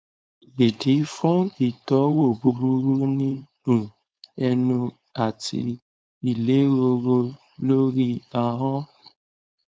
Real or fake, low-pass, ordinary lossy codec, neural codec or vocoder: fake; none; none; codec, 16 kHz, 4.8 kbps, FACodec